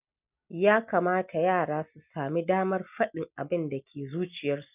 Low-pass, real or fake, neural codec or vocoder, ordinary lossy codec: 3.6 kHz; real; none; none